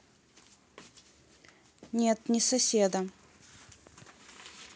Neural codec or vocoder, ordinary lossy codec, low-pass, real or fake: none; none; none; real